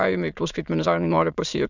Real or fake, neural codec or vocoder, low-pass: fake; autoencoder, 22.05 kHz, a latent of 192 numbers a frame, VITS, trained on many speakers; 7.2 kHz